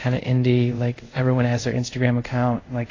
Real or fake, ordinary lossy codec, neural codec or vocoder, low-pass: fake; AAC, 32 kbps; codec, 24 kHz, 0.5 kbps, DualCodec; 7.2 kHz